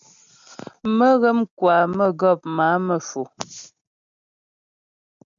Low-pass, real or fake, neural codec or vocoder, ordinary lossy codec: 7.2 kHz; real; none; MP3, 64 kbps